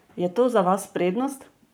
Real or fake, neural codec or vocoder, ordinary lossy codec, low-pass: fake; codec, 44.1 kHz, 7.8 kbps, Pupu-Codec; none; none